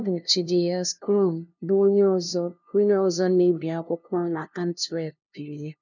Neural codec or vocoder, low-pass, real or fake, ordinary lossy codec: codec, 16 kHz, 0.5 kbps, FunCodec, trained on LibriTTS, 25 frames a second; 7.2 kHz; fake; none